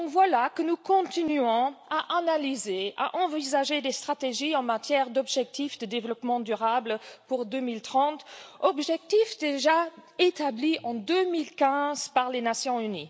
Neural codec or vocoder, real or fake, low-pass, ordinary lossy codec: none; real; none; none